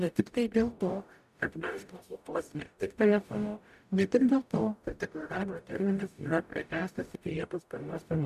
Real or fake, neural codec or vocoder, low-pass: fake; codec, 44.1 kHz, 0.9 kbps, DAC; 14.4 kHz